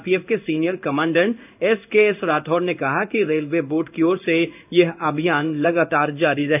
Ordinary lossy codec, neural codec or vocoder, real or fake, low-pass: none; codec, 16 kHz in and 24 kHz out, 1 kbps, XY-Tokenizer; fake; 3.6 kHz